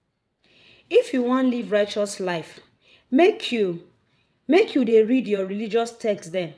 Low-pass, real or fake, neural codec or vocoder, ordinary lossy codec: none; fake; vocoder, 22.05 kHz, 80 mel bands, WaveNeXt; none